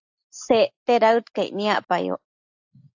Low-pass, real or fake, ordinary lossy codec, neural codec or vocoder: 7.2 kHz; real; AAC, 48 kbps; none